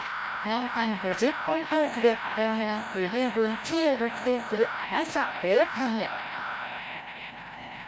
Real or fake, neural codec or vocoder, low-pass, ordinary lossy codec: fake; codec, 16 kHz, 0.5 kbps, FreqCodec, larger model; none; none